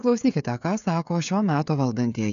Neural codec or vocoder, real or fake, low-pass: codec, 16 kHz, 16 kbps, FreqCodec, smaller model; fake; 7.2 kHz